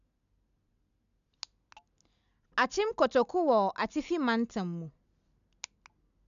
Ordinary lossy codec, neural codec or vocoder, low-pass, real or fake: none; none; 7.2 kHz; real